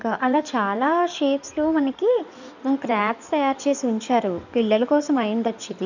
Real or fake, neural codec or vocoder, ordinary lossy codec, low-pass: fake; codec, 16 kHz in and 24 kHz out, 2.2 kbps, FireRedTTS-2 codec; none; 7.2 kHz